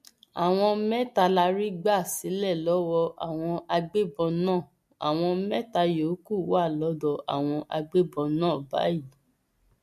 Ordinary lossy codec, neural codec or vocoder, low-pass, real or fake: MP3, 64 kbps; none; 14.4 kHz; real